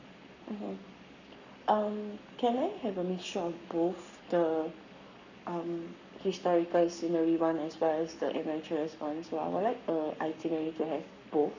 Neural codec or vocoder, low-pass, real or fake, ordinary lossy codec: codec, 44.1 kHz, 7.8 kbps, Pupu-Codec; 7.2 kHz; fake; none